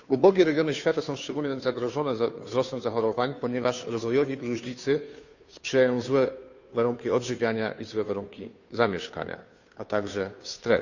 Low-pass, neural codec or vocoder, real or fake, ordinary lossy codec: 7.2 kHz; codec, 16 kHz, 2 kbps, FunCodec, trained on Chinese and English, 25 frames a second; fake; none